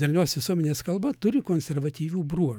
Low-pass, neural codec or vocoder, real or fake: 19.8 kHz; autoencoder, 48 kHz, 128 numbers a frame, DAC-VAE, trained on Japanese speech; fake